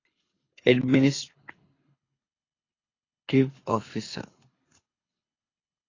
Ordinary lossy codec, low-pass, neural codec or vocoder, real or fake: AAC, 32 kbps; 7.2 kHz; codec, 24 kHz, 6 kbps, HILCodec; fake